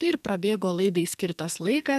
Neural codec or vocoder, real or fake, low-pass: codec, 44.1 kHz, 2.6 kbps, SNAC; fake; 14.4 kHz